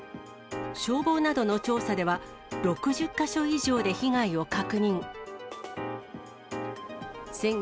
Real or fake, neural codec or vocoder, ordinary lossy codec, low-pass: real; none; none; none